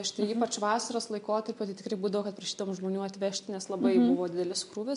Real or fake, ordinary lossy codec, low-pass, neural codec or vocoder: real; MP3, 64 kbps; 10.8 kHz; none